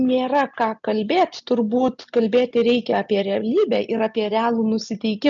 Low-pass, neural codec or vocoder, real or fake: 7.2 kHz; none; real